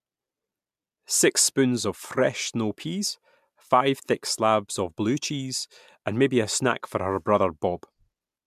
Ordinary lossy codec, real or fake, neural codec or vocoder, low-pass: MP3, 96 kbps; real; none; 14.4 kHz